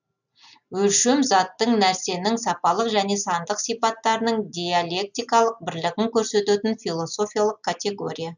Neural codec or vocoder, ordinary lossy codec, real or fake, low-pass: none; none; real; 7.2 kHz